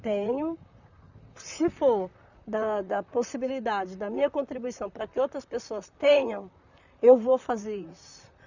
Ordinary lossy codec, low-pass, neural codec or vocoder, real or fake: none; 7.2 kHz; vocoder, 44.1 kHz, 128 mel bands, Pupu-Vocoder; fake